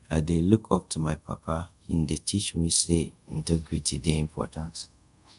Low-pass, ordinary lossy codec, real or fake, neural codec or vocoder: 10.8 kHz; none; fake; codec, 24 kHz, 0.5 kbps, DualCodec